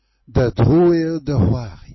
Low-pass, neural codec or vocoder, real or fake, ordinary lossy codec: 7.2 kHz; none; real; MP3, 24 kbps